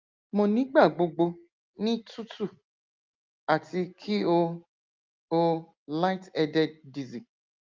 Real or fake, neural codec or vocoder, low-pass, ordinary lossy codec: real; none; 7.2 kHz; Opus, 24 kbps